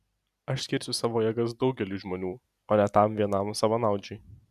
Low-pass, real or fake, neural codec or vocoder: 14.4 kHz; real; none